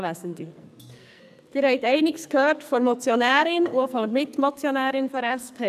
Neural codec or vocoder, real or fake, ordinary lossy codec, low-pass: codec, 44.1 kHz, 2.6 kbps, SNAC; fake; none; 14.4 kHz